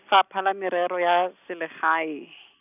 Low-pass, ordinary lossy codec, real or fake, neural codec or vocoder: 3.6 kHz; none; real; none